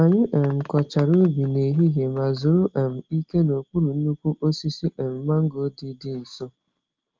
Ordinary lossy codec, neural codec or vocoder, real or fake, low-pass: Opus, 24 kbps; none; real; 7.2 kHz